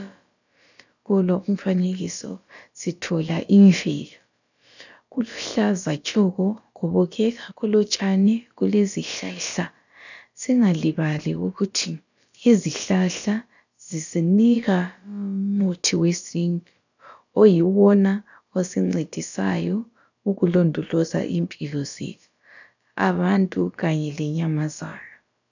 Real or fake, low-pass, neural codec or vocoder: fake; 7.2 kHz; codec, 16 kHz, about 1 kbps, DyCAST, with the encoder's durations